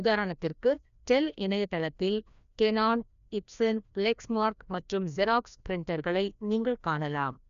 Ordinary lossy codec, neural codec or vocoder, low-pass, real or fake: none; codec, 16 kHz, 1 kbps, FreqCodec, larger model; 7.2 kHz; fake